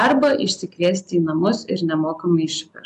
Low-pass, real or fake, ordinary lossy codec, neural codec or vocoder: 10.8 kHz; real; MP3, 96 kbps; none